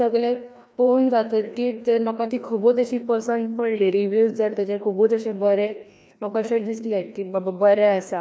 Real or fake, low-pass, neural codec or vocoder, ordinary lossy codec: fake; none; codec, 16 kHz, 1 kbps, FreqCodec, larger model; none